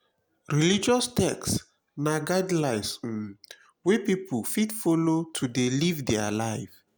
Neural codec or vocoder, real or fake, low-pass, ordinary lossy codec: none; real; none; none